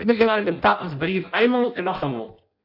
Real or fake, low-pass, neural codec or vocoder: fake; 5.4 kHz; codec, 16 kHz in and 24 kHz out, 0.6 kbps, FireRedTTS-2 codec